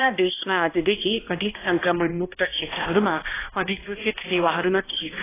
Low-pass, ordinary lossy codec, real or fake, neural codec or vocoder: 3.6 kHz; AAC, 16 kbps; fake; codec, 16 kHz, 1 kbps, X-Codec, HuBERT features, trained on balanced general audio